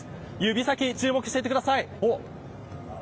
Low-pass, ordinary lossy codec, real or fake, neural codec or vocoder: none; none; real; none